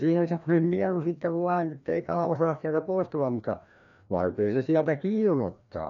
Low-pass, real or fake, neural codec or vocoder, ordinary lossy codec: 7.2 kHz; fake; codec, 16 kHz, 1 kbps, FreqCodec, larger model; none